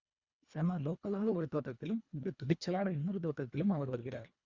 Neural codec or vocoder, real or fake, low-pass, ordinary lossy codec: codec, 24 kHz, 1.5 kbps, HILCodec; fake; 7.2 kHz; Opus, 64 kbps